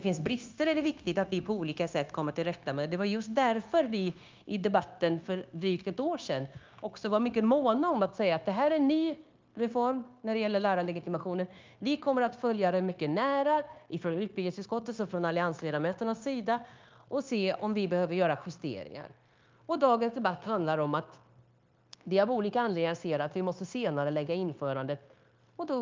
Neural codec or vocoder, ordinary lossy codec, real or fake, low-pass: codec, 16 kHz, 0.9 kbps, LongCat-Audio-Codec; Opus, 24 kbps; fake; 7.2 kHz